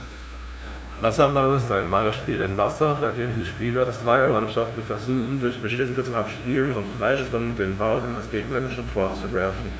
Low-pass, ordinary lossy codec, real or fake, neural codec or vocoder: none; none; fake; codec, 16 kHz, 0.5 kbps, FunCodec, trained on LibriTTS, 25 frames a second